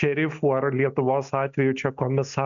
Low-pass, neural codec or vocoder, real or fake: 7.2 kHz; none; real